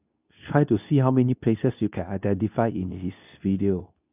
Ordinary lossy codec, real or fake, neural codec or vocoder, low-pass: none; fake; codec, 24 kHz, 0.9 kbps, WavTokenizer, medium speech release version 2; 3.6 kHz